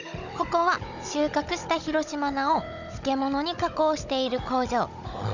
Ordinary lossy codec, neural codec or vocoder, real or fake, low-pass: none; codec, 16 kHz, 16 kbps, FunCodec, trained on Chinese and English, 50 frames a second; fake; 7.2 kHz